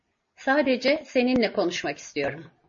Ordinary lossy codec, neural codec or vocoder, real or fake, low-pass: MP3, 32 kbps; none; real; 7.2 kHz